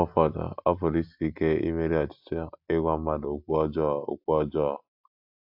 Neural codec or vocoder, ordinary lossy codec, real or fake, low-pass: none; AAC, 48 kbps; real; 5.4 kHz